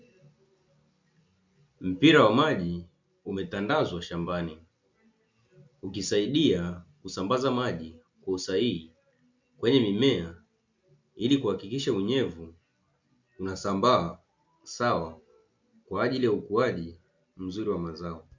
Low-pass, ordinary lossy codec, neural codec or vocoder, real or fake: 7.2 kHz; MP3, 64 kbps; none; real